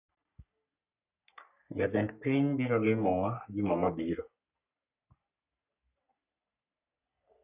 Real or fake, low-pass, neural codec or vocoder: fake; 3.6 kHz; codec, 44.1 kHz, 3.4 kbps, Pupu-Codec